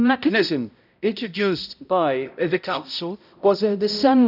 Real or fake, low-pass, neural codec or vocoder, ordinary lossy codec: fake; 5.4 kHz; codec, 16 kHz, 0.5 kbps, X-Codec, HuBERT features, trained on balanced general audio; none